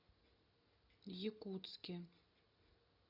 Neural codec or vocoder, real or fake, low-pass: none; real; 5.4 kHz